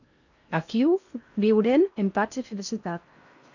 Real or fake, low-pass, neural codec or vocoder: fake; 7.2 kHz; codec, 16 kHz in and 24 kHz out, 0.6 kbps, FocalCodec, streaming, 4096 codes